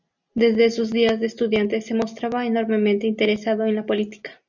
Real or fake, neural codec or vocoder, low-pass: real; none; 7.2 kHz